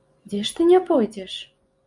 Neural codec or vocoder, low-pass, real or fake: vocoder, 24 kHz, 100 mel bands, Vocos; 10.8 kHz; fake